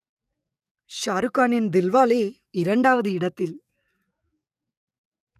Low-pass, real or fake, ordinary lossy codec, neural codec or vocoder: 14.4 kHz; fake; none; codec, 44.1 kHz, 7.8 kbps, DAC